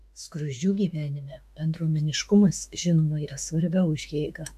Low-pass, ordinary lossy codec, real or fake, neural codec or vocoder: 14.4 kHz; AAC, 96 kbps; fake; autoencoder, 48 kHz, 32 numbers a frame, DAC-VAE, trained on Japanese speech